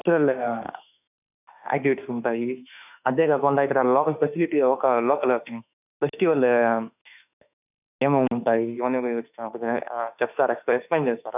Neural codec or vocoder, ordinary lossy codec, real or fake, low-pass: autoencoder, 48 kHz, 32 numbers a frame, DAC-VAE, trained on Japanese speech; none; fake; 3.6 kHz